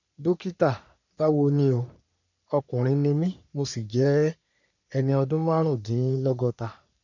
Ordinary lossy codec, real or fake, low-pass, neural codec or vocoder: none; fake; 7.2 kHz; codec, 44.1 kHz, 3.4 kbps, Pupu-Codec